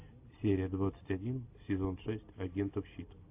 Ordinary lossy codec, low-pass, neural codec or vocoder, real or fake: AAC, 32 kbps; 3.6 kHz; none; real